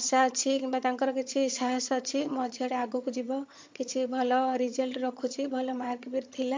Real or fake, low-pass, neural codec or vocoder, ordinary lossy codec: fake; 7.2 kHz; vocoder, 22.05 kHz, 80 mel bands, HiFi-GAN; MP3, 48 kbps